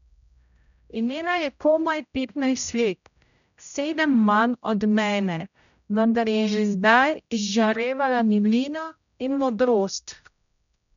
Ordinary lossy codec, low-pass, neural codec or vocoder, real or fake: none; 7.2 kHz; codec, 16 kHz, 0.5 kbps, X-Codec, HuBERT features, trained on general audio; fake